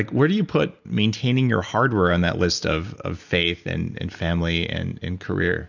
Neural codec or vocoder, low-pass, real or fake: none; 7.2 kHz; real